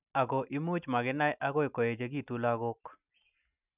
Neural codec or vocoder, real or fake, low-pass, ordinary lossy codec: none; real; 3.6 kHz; none